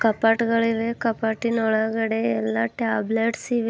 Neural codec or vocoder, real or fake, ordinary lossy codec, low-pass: none; real; none; none